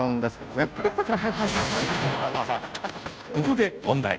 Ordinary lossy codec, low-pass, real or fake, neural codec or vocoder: none; none; fake; codec, 16 kHz, 0.5 kbps, FunCodec, trained on Chinese and English, 25 frames a second